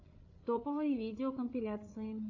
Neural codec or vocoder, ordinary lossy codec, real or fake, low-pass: codec, 16 kHz, 8 kbps, FreqCodec, larger model; AAC, 48 kbps; fake; 7.2 kHz